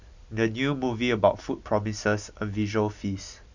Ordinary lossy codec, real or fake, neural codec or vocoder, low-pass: none; real; none; 7.2 kHz